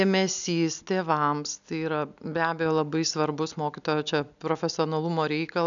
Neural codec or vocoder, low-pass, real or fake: none; 7.2 kHz; real